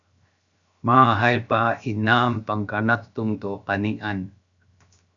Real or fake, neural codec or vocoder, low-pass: fake; codec, 16 kHz, 0.7 kbps, FocalCodec; 7.2 kHz